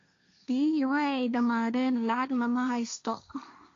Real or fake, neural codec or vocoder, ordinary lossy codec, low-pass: fake; codec, 16 kHz, 1.1 kbps, Voila-Tokenizer; AAC, 48 kbps; 7.2 kHz